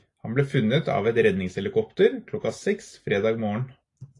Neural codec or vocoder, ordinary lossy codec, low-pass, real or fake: none; AAC, 48 kbps; 10.8 kHz; real